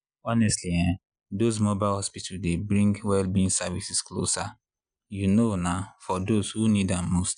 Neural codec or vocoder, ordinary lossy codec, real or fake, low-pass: none; none; real; 9.9 kHz